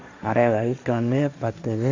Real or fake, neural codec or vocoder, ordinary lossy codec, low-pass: fake; codec, 16 kHz, 1.1 kbps, Voila-Tokenizer; MP3, 64 kbps; 7.2 kHz